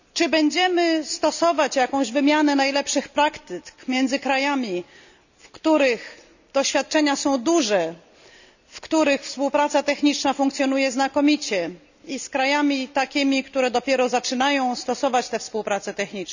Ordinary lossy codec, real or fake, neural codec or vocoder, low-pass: none; real; none; 7.2 kHz